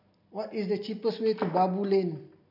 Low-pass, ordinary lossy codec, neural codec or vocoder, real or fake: 5.4 kHz; none; none; real